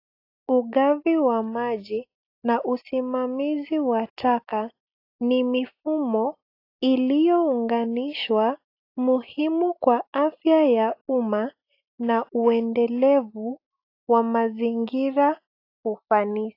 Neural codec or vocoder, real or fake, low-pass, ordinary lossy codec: none; real; 5.4 kHz; AAC, 32 kbps